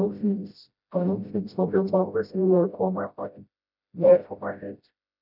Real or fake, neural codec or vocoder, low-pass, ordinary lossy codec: fake; codec, 16 kHz, 0.5 kbps, FreqCodec, smaller model; 5.4 kHz; none